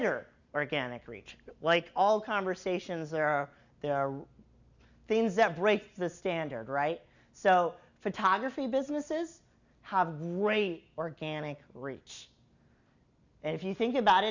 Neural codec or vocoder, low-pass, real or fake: none; 7.2 kHz; real